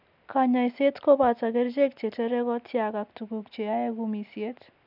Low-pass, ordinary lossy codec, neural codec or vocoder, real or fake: 5.4 kHz; none; none; real